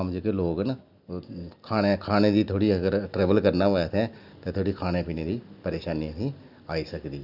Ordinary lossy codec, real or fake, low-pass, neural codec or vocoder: none; real; 5.4 kHz; none